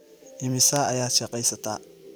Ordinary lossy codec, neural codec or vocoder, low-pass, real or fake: none; vocoder, 44.1 kHz, 128 mel bands every 512 samples, BigVGAN v2; none; fake